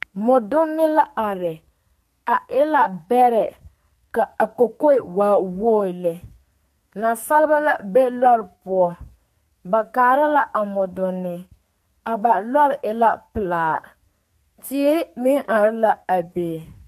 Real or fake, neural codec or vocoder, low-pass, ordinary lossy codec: fake; codec, 44.1 kHz, 2.6 kbps, SNAC; 14.4 kHz; MP3, 64 kbps